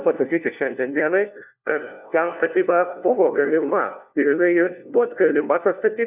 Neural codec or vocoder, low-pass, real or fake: codec, 16 kHz, 1 kbps, FunCodec, trained on LibriTTS, 50 frames a second; 3.6 kHz; fake